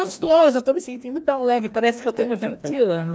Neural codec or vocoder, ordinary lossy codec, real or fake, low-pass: codec, 16 kHz, 1 kbps, FreqCodec, larger model; none; fake; none